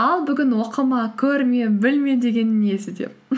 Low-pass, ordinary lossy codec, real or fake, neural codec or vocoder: none; none; real; none